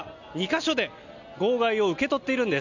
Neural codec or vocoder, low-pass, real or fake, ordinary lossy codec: none; 7.2 kHz; real; none